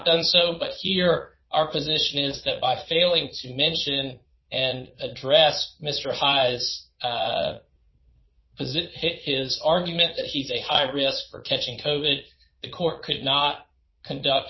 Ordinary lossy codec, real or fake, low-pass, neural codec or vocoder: MP3, 24 kbps; fake; 7.2 kHz; vocoder, 22.05 kHz, 80 mel bands, Vocos